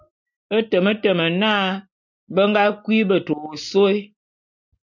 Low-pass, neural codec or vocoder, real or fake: 7.2 kHz; none; real